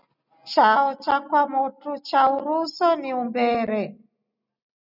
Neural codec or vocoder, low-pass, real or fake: none; 5.4 kHz; real